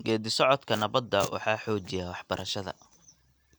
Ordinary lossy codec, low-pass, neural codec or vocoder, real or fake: none; none; none; real